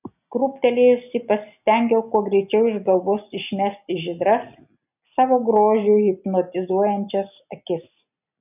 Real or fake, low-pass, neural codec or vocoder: real; 3.6 kHz; none